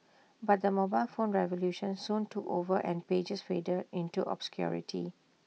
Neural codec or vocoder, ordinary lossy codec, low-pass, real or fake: none; none; none; real